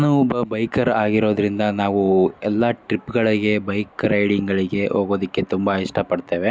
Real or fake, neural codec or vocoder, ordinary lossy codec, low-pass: real; none; none; none